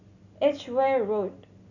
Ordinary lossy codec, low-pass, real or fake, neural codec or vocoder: none; 7.2 kHz; real; none